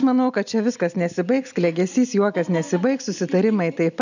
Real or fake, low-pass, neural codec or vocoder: real; 7.2 kHz; none